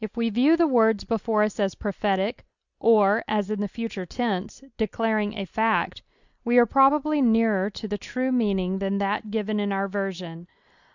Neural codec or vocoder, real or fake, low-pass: none; real; 7.2 kHz